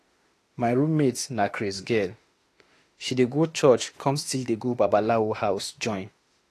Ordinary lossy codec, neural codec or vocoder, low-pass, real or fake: AAC, 48 kbps; autoencoder, 48 kHz, 32 numbers a frame, DAC-VAE, trained on Japanese speech; 14.4 kHz; fake